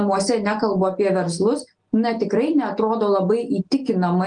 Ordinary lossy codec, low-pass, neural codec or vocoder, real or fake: Opus, 32 kbps; 9.9 kHz; none; real